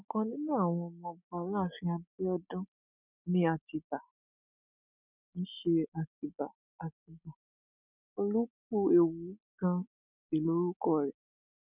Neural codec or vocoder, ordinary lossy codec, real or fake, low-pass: none; none; real; 3.6 kHz